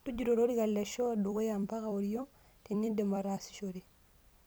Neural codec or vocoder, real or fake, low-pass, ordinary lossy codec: vocoder, 44.1 kHz, 128 mel bands every 256 samples, BigVGAN v2; fake; none; none